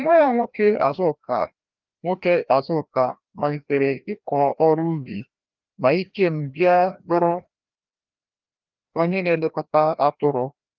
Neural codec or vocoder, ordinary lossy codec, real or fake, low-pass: codec, 16 kHz, 1 kbps, FreqCodec, larger model; Opus, 24 kbps; fake; 7.2 kHz